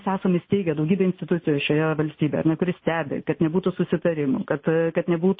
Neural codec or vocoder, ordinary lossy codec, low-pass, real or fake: none; MP3, 24 kbps; 7.2 kHz; real